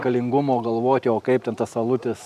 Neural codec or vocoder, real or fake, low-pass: none; real; 14.4 kHz